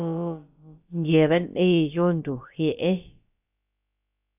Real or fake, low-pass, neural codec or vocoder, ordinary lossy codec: fake; 3.6 kHz; codec, 16 kHz, about 1 kbps, DyCAST, with the encoder's durations; AAC, 32 kbps